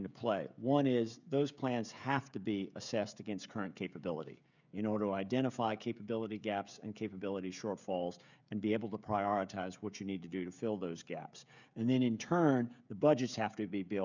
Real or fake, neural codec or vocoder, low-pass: fake; codec, 16 kHz, 16 kbps, FreqCodec, smaller model; 7.2 kHz